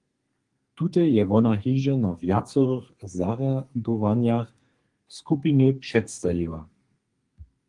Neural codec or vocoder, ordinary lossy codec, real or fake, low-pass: codec, 32 kHz, 1.9 kbps, SNAC; Opus, 24 kbps; fake; 10.8 kHz